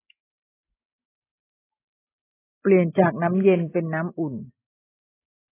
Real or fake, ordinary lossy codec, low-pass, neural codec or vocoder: real; AAC, 16 kbps; 3.6 kHz; none